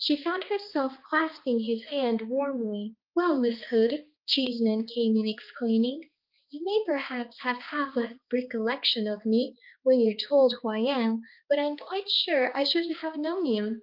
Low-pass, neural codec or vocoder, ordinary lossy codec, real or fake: 5.4 kHz; codec, 16 kHz, 2 kbps, X-Codec, HuBERT features, trained on balanced general audio; Opus, 24 kbps; fake